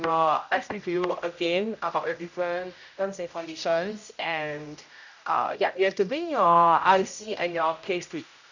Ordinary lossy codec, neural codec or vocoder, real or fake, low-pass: none; codec, 16 kHz, 0.5 kbps, X-Codec, HuBERT features, trained on general audio; fake; 7.2 kHz